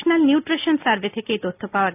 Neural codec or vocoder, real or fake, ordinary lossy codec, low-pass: none; real; AAC, 32 kbps; 3.6 kHz